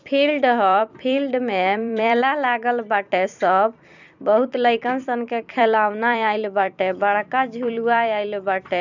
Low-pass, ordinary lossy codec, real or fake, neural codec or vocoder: 7.2 kHz; none; fake; vocoder, 44.1 kHz, 128 mel bands every 256 samples, BigVGAN v2